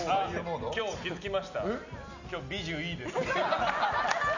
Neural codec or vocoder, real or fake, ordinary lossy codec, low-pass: none; real; none; 7.2 kHz